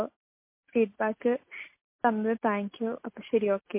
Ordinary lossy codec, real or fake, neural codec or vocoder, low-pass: MP3, 24 kbps; real; none; 3.6 kHz